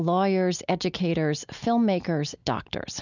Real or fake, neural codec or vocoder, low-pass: real; none; 7.2 kHz